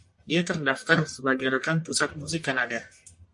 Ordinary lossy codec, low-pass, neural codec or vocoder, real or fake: MP3, 48 kbps; 10.8 kHz; codec, 44.1 kHz, 1.7 kbps, Pupu-Codec; fake